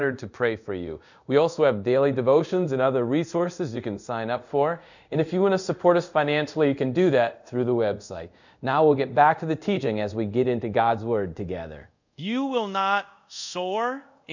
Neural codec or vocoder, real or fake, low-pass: codec, 24 kHz, 0.5 kbps, DualCodec; fake; 7.2 kHz